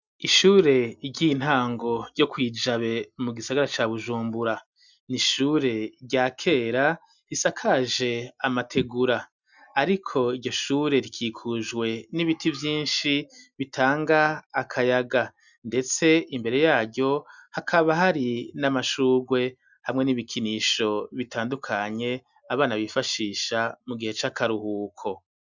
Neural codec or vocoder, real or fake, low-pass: none; real; 7.2 kHz